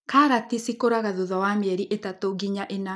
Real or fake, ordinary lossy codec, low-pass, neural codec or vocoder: real; none; none; none